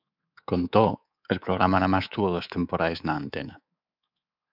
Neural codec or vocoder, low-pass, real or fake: codec, 24 kHz, 3.1 kbps, DualCodec; 5.4 kHz; fake